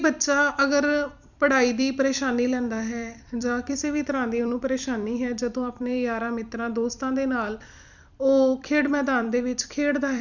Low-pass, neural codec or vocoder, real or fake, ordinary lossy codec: 7.2 kHz; vocoder, 44.1 kHz, 128 mel bands every 256 samples, BigVGAN v2; fake; none